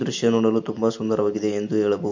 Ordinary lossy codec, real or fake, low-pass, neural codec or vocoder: MP3, 48 kbps; real; 7.2 kHz; none